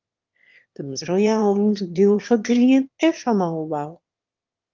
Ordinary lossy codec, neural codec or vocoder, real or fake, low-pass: Opus, 32 kbps; autoencoder, 22.05 kHz, a latent of 192 numbers a frame, VITS, trained on one speaker; fake; 7.2 kHz